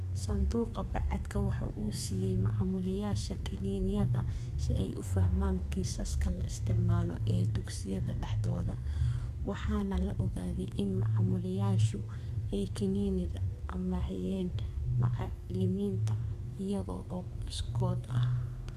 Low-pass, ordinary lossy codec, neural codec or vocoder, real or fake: 14.4 kHz; AAC, 96 kbps; codec, 32 kHz, 1.9 kbps, SNAC; fake